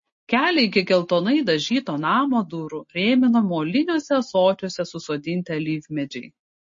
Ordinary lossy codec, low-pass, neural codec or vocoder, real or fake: MP3, 32 kbps; 7.2 kHz; none; real